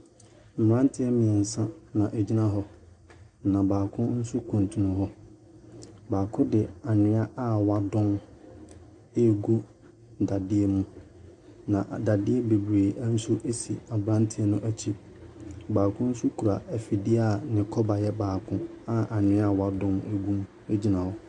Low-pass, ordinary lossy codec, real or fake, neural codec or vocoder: 10.8 kHz; AAC, 64 kbps; real; none